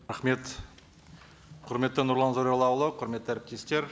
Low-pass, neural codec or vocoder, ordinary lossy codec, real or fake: none; none; none; real